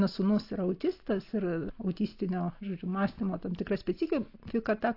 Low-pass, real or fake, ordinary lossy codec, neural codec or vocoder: 5.4 kHz; real; AAC, 32 kbps; none